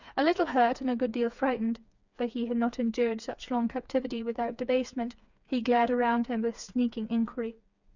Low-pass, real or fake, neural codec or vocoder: 7.2 kHz; fake; codec, 16 kHz, 4 kbps, FreqCodec, smaller model